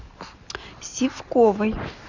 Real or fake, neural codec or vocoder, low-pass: real; none; 7.2 kHz